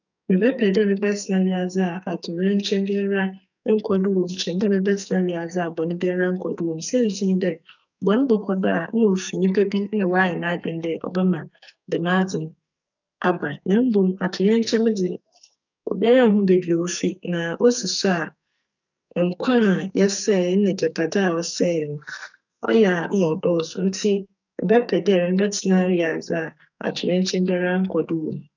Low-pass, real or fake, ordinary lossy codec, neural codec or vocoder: 7.2 kHz; fake; none; codec, 44.1 kHz, 2.6 kbps, SNAC